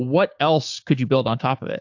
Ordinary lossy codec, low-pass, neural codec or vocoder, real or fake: AAC, 48 kbps; 7.2 kHz; codec, 16 kHz, 6 kbps, DAC; fake